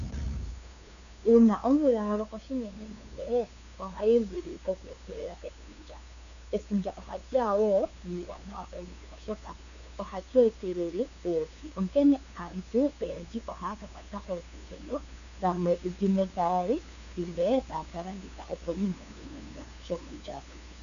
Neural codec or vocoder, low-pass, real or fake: codec, 16 kHz, 2 kbps, FunCodec, trained on LibriTTS, 25 frames a second; 7.2 kHz; fake